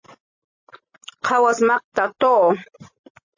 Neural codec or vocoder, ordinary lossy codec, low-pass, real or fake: none; MP3, 32 kbps; 7.2 kHz; real